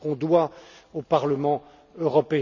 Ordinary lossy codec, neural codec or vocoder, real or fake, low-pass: none; none; real; 7.2 kHz